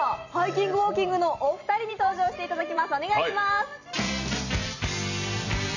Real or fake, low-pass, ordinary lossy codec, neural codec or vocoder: fake; 7.2 kHz; none; vocoder, 44.1 kHz, 128 mel bands every 256 samples, BigVGAN v2